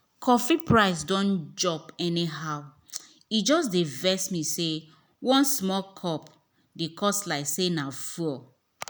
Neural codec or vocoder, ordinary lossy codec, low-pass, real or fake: none; none; none; real